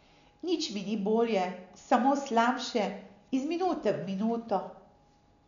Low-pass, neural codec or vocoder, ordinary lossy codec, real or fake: 7.2 kHz; none; none; real